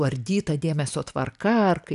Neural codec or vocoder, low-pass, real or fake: none; 10.8 kHz; real